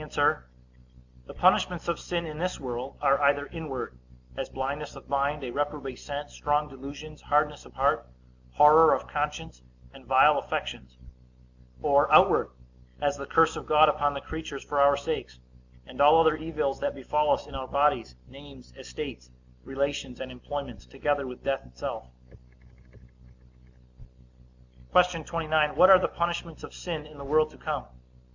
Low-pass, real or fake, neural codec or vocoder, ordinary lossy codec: 7.2 kHz; real; none; Opus, 64 kbps